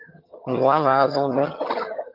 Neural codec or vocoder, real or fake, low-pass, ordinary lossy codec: vocoder, 22.05 kHz, 80 mel bands, HiFi-GAN; fake; 5.4 kHz; Opus, 24 kbps